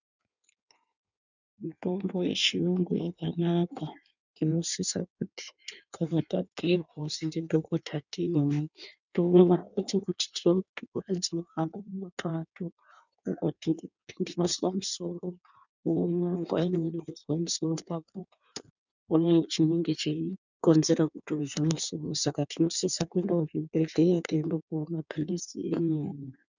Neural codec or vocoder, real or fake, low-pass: codec, 16 kHz in and 24 kHz out, 1.1 kbps, FireRedTTS-2 codec; fake; 7.2 kHz